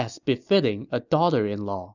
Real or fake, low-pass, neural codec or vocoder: real; 7.2 kHz; none